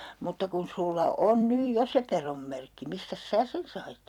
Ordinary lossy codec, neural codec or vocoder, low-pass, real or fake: none; vocoder, 44.1 kHz, 128 mel bands every 256 samples, BigVGAN v2; 19.8 kHz; fake